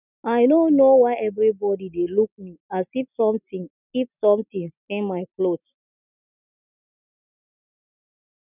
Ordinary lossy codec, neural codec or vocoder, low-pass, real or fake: none; none; 3.6 kHz; real